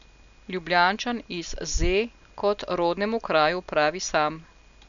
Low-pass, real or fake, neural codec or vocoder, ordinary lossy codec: 7.2 kHz; real; none; none